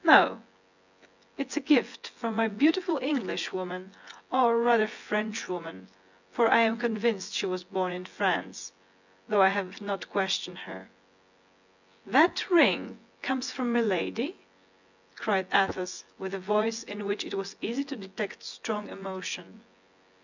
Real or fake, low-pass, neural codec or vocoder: fake; 7.2 kHz; vocoder, 24 kHz, 100 mel bands, Vocos